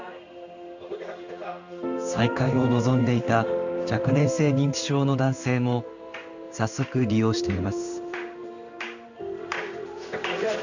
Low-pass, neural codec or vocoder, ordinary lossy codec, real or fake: 7.2 kHz; codec, 16 kHz in and 24 kHz out, 1 kbps, XY-Tokenizer; none; fake